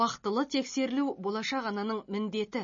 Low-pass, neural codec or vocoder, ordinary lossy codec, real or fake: 7.2 kHz; none; MP3, 32 kbps; real